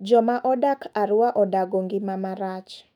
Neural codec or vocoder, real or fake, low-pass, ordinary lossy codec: autoencoder, 48 kHz, 128 numbers a frame, DAC-VAE, trained on Japanese speech; fake; 19.8 kHz; none